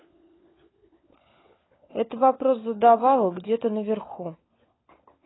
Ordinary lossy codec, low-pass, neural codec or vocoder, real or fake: AAC, 16 kbps; 7.2 kHz; codec, 16 kHz, 16 kbps, FunCodec, trained on LibriTTS, 50 frames a second; fake